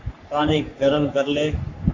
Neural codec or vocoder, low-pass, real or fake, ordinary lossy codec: codec, 44.1 kHz, 3.4 kbps, Pupu-Codec; 7.2 kHz; fake; AAC, 48 kbps